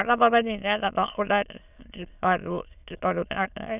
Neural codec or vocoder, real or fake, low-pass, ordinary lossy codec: autoencoder, 22.05 kHz, a latent of 192 numbers a frame, VITS, trained on many speakers; fake; 3.6 kHz; none